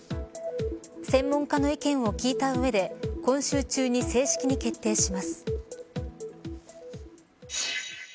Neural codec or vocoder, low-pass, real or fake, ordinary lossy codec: none; none; real; none